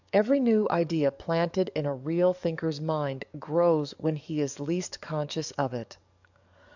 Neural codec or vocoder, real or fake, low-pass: codec, 44.1 kHz, 7.8 kbps, DAC; fake; 7.2 kHz